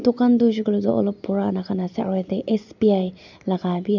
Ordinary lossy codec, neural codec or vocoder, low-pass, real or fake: none; none; 7.2 kHz; real